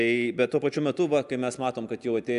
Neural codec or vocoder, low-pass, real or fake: none; 10.8 kHz; real